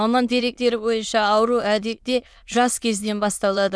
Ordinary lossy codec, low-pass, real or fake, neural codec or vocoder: none; none; fake; autoencoder, 22.05 kHz, a latent of 192 numbers a frame, VITS, trained on many speakers